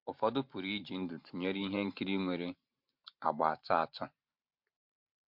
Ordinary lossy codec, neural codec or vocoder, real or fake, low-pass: MP3, 48 kbps; vocoder, 44.1 kHz, 128 mel bands every 512 samples, BigVGAN v2; fake; 5.4 kHz